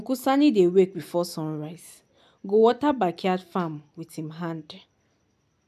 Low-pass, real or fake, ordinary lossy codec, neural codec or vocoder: 14.4 kHz; real; none; none